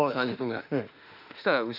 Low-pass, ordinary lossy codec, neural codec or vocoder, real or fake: 5.4 kHz; none; autoencoder, 48 kHz, 32 numbers a frame, DAC-VAE, trained on Japanese speech; fake